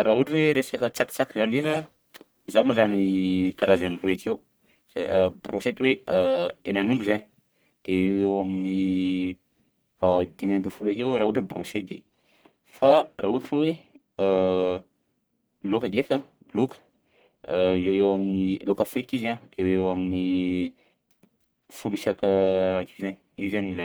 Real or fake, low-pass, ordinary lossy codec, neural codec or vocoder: fake; none; none; codec, 44.1 kHz, 1.7 kbps, Pupu-Codec